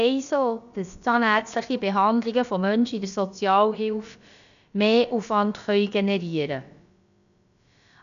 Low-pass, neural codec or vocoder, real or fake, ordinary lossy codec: 7.2 kHz; codec, 16 kHz, about 1 kbps, DyCAST, with the encoder's durations; fake; none